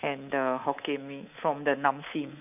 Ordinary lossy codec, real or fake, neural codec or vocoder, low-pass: none; fake; codec, 24 kHz, 3.1 kbps, DualCodec; 3.6 kHz